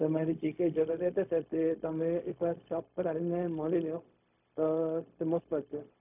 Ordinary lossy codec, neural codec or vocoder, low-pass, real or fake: none; codec, 16 kHz, 0.4 kbps, LongCat-Audio-Codec; 3.6 kHz; fake